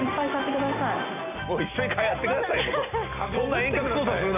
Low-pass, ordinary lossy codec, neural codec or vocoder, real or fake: 3.6 kHz; none; none; real